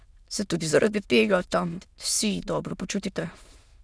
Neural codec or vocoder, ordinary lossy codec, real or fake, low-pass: autoencoder, 22.05 kHz, a latent of 192 numbers a frame, VITS, trained on many speakers; none; fake; none